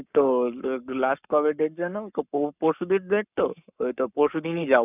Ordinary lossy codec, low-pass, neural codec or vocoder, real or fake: none; 3.6 kHz; codec, 44.1 kHz, 7.8 kbps, Pupu-Codec; fake